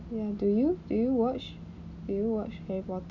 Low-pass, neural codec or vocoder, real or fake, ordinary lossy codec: 7.2 kHz; none; real; none